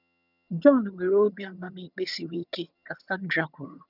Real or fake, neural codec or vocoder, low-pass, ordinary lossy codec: fake; vocoder, 22.05 kHz, 80 mel bands, HiFi-GAN; 5.4 kHz; none